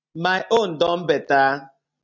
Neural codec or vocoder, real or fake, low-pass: none; real; 7.2 kHz